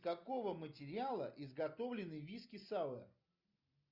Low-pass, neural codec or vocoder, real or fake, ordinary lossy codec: 5.4 kHz; none; real; Opus, 64 kbps